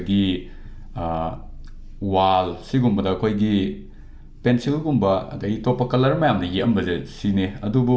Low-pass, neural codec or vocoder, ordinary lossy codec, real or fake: none; none; none; real